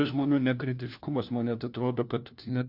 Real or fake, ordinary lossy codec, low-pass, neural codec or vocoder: fake; Opus, 64 kbps; 5.4 kHz; codec, 16 kHz, 1 kbps, FunCodec, trained on LibriTTS, 50 frames a second